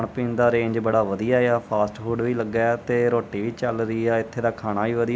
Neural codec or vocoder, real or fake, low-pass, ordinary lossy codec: none; real; none; none